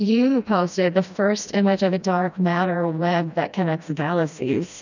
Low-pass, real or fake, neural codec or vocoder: 7.2 kHz; fake; codec, 16 kHz, 1 kbps, FreqCodec, smaller model